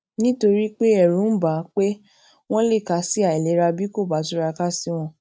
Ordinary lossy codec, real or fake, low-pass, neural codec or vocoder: none; real; none; none